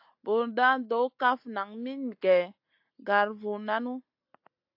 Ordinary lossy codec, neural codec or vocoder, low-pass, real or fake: AAC, 48 kbps; none; 5.4 kHz; real